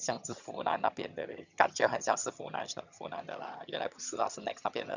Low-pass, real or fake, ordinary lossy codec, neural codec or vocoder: 7.2 kHz; fake; none; vocoder, 22.05 kHz, 80 mel bands, HiFi-GAN